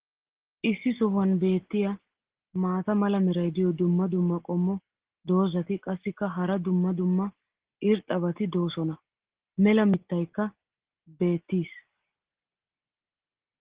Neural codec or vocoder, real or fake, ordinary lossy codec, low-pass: none; real; Opus, 16 kbps; 3.6 kHz